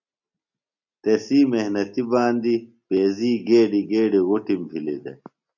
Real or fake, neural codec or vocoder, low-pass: real; none; 7.2 kHz